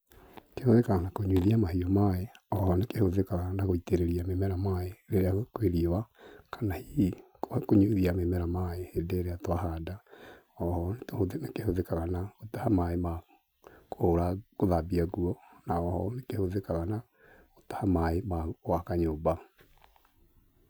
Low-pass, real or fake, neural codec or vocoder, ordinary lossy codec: none; real; none; none